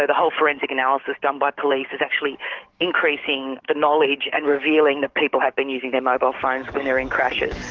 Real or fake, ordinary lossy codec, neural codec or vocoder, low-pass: fake; Opus, 16 kbps; codec, 44.1 kHz, 7.8 kbps, DAC; 7.2 kHz